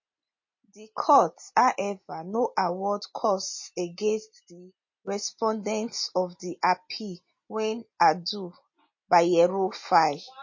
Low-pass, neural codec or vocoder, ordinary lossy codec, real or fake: 7.2 kHz; none; MP3, 32 kbps; real